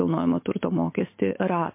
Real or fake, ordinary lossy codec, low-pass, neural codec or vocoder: real; MP3, 24 kbps; 3.6 kHz; none